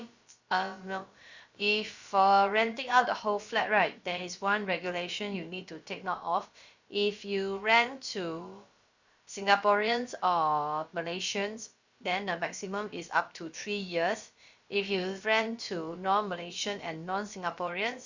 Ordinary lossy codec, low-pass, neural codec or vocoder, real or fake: Opus, 64 kbps; 7.2 kHz; codec, 16 kHz, about 1 kbps, DyCAST, with the encoder's durations; fake